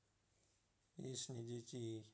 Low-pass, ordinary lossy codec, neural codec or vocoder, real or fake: none; none; none; real